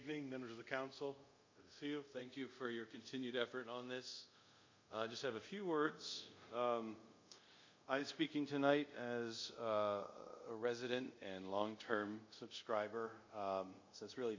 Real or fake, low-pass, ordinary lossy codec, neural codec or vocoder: fake; 7.2 kHz; MP3, 48 kbps; codec, 24 kHz, 0.5 kbps, DualCodec